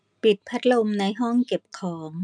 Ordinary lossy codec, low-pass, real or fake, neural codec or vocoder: none; 9.9 kHz; real; none